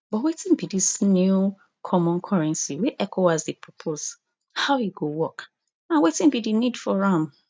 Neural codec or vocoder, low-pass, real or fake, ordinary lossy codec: none; none; real; none